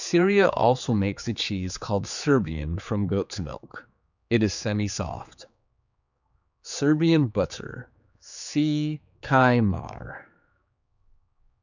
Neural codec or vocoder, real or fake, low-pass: codec, 16 kHz, 4 kbps, X-Codec, HuBERT features, trained on general audio; fake; 7.2 kHz